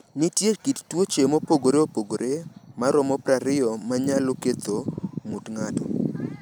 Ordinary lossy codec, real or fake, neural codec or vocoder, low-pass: none; real; none; none